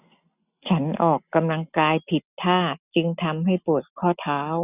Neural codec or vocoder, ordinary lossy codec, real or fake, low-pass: none; none; real; 3.6 kHz